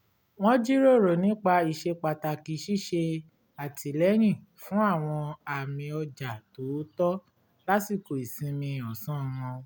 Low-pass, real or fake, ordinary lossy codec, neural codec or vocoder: none; real; none; none